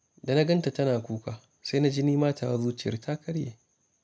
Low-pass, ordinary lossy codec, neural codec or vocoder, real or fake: none; none; none; real